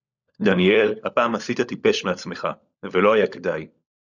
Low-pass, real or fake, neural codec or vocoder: 7.2 kHz; fake; codec, 16 kHz, 16 kbps, FunCodec, trained on LibriTTS, 50 frames a second